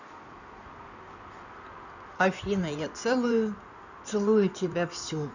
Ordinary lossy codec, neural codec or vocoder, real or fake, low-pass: none; codec, 16 kHz in and 24 kHz out, 2.2 kbps, FireRedTTS-2 codec; fake; 7.2 kHz